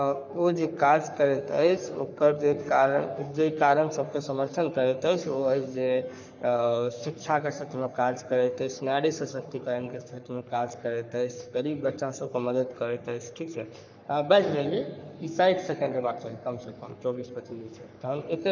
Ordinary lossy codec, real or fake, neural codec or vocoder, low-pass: none; fake; codec, 44.1 kHz, 3.4 kbps, Pupu-Codec; 7.2 kHz